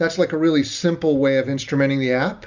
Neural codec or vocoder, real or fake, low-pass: none; real; 7.2 kHz